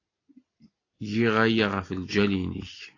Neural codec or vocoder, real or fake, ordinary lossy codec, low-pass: none; real; AAC, 32 kbps; 7.2 kHz